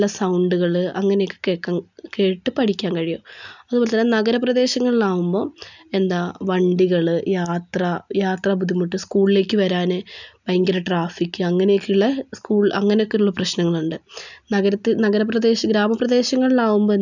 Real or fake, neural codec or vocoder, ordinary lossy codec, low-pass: real; none; none; 7.2 kHz